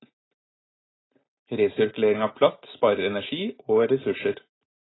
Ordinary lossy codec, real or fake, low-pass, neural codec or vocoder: AAC, 16 kbps; real; 7.2 kHz; none